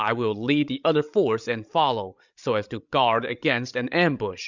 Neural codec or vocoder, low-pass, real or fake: codec, 16 kHz, 16 kbps, FreqCodec, larger model; 7.2 kHz; fake